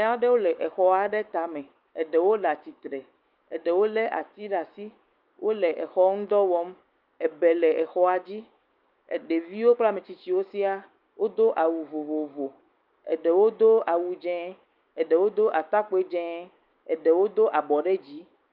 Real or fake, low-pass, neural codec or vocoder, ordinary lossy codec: fake; 5.4 kHz; autoencoder, 48 kHz, 128 numbers a frame, DAC-VAE, trained on Japanese speech; Opus, 32 kbps